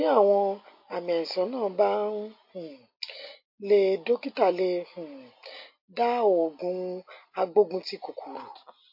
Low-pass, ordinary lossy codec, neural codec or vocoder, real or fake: 5.4 kHz; MP3, 32 kbps; none; real